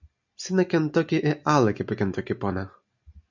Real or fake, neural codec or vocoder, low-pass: real; none; 7.2 kHz